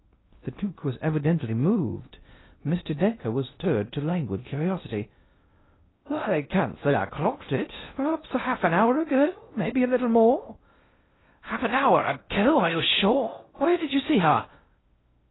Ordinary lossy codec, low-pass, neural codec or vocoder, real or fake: AAC, 16 kbps; 7.2 kHz; codec, 16 kHz in and 24 kHz out, 0.6 kbps, FocalCodec, streaming, 2048 codes; fake